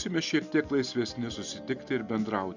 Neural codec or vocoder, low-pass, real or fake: none; 7.2 kHz; real